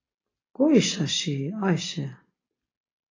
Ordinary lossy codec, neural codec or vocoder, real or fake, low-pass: AAC, 32 kbps; none; real; 7.2 kHz